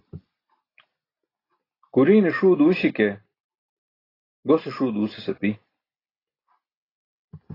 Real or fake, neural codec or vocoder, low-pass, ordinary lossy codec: real; none; 5.4 kHz; AAC, 24 kbps